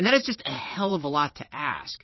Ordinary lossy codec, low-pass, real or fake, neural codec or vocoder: MP3, 24 kbps; 7.2 kHz; fake; vocoder, 22.05 kHz, 80 mel bands, WaveNeXt